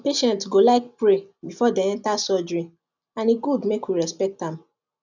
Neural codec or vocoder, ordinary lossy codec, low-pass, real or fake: none; none; 7.2 kHz; real